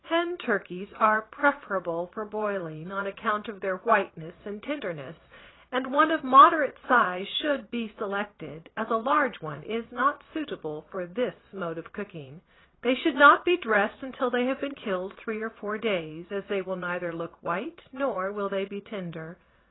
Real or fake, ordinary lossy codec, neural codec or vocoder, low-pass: fake; AAC, 16 kbps; vocoder, 22.05 kHz, 80 mel bands, Vocos; 7.2 kHz